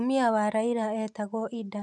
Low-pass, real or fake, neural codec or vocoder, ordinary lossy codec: 10.8 kHz; real; none; none